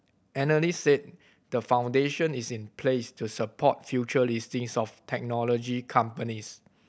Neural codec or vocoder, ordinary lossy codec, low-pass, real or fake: none; none; none; real